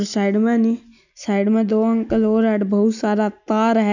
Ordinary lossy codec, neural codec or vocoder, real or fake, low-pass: none; none; real; 7.2 kHz